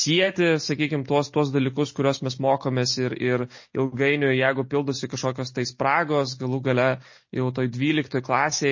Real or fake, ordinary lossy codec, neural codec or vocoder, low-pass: real; MP3, 32 kbps; none; 7.2 kHz